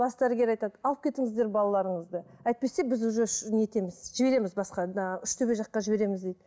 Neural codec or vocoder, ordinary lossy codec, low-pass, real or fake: none; none; none; real